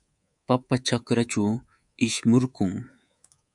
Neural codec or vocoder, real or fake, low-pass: codec, 24 kHz, 3.1 kbps, DualCodec; fake; 10.8 kHz